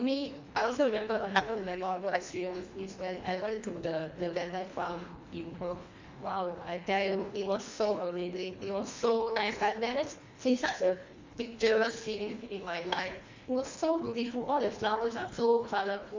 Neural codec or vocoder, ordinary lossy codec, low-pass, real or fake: codec, 24 kHz, 1.5 kbps, HILCodec; MP3, 64 kbps; 7.2 kHz; fake